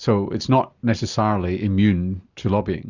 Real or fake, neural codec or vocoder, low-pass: real; none; 7.2 kHz